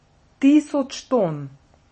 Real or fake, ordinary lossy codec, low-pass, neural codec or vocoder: real; MP3, 32 kbps; 10.8 kHz; none